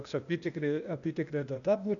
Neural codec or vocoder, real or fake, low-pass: codec, 16 kHz, 0.8 kbps, ZipCodec; fake; 7.2 kHz